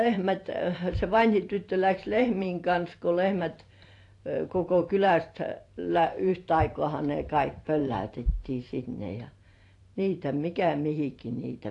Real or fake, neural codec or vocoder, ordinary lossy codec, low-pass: fake; vocoder, 44.1 kHz, 128 mel bands every 512 samples, BigVGAN v2; AAC, 48 kbps; 10.8 kHz